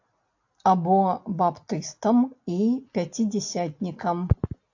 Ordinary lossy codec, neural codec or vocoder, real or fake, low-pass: AAC, 48 kbps; none; real; 7.2 kHz